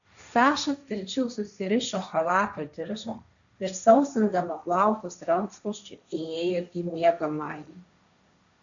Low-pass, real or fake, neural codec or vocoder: 7.2 kHz; fake; codec, 16 kHz, 1.1 kbps, Voila-Tokenizer